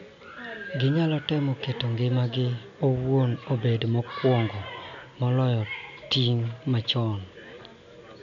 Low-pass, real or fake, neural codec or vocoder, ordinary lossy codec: 7.2 kHz; real; none; AAC, 64 kbps